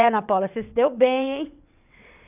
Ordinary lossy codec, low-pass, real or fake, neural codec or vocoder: none; 3.6 kHz; fake; vocoder, 22.05 kHz, 80 mel bands, WaveNeXt